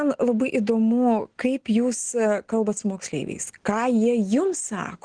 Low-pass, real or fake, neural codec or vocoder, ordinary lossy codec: 9.9 kHz; real; none; Opus, 16 kbps